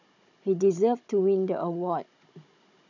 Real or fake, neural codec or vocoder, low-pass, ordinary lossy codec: fake; codec, 16 kHz, 16 kbps, FunCodec, trained on Chinese and English, 50 frames a second; 7.2 kHz; none